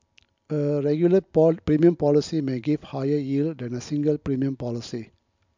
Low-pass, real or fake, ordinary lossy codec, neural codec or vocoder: 7.2 kHz; real; MP3, 64 kbps; none